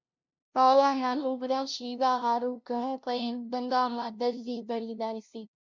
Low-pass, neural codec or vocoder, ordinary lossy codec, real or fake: 7.2 kHz; codec, 16 kHz, 0.5 kbps, FunCodec, trained on LibriTTS, 25 frames a second; MP3, 64 kbps; fake